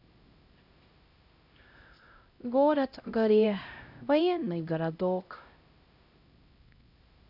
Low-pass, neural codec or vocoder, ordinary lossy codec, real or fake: 5.4 kHz; codec, 16 kHz, 0.5 kbps, X-Codec, HuBERT features, trained on LibriSpeech; none; fake